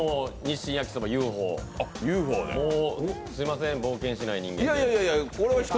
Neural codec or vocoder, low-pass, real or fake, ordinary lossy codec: none; none; real; none